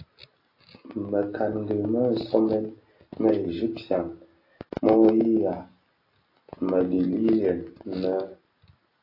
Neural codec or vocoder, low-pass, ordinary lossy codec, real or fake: none; 5.4 kHz; AAC, 32 kbps; real